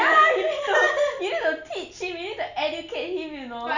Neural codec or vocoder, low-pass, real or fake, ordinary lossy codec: none; 7.2 kHz; real; none